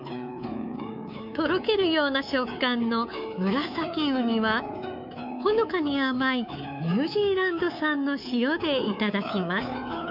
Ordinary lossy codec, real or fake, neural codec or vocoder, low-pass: none; fake; codec, 24 kHz, 3.1 kbps, DualCodec; 5.4 kHz